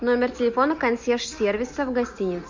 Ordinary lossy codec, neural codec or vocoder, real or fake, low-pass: MP3, 64 kbps; none; real; 7.2 kHz